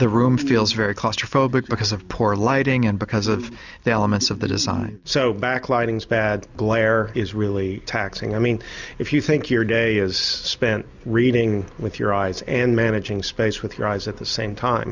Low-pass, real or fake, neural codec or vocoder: 7.2 kHz; real; none